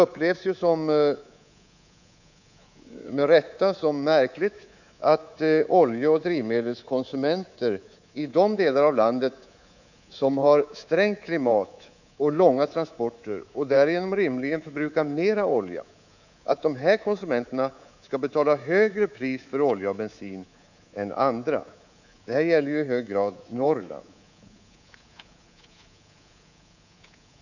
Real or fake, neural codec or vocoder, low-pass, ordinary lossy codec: fake; codec, 24 kHz, 3.1 kbps, DualCodec; 7.2 kHz; none